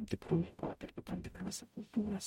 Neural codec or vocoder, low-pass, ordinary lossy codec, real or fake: codec, 44.1 kHz, 0.9 kbps, DAC; 19.8 kHz; MP3, 64 kbps; fake